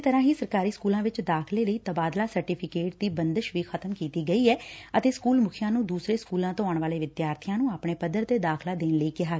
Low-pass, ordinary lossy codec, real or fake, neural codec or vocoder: none; none; real; none